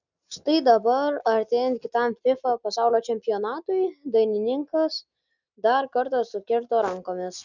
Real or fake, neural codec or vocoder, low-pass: real; none; 7.2 kHz